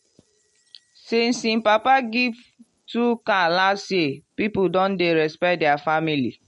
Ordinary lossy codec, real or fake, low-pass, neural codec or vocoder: MP3, 48 kbps; real; 14.4 kHz; none